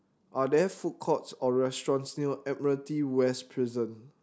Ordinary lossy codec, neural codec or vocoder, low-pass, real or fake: none; none; none; real